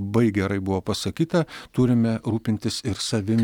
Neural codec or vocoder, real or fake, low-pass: none; real; 19.8 kHz